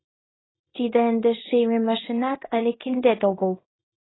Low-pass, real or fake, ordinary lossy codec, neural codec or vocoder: 7.2 kHz; fake; AAC, 16 kbps; codec, 24 kHz, 0.9 kbps, WavTokenizer, small release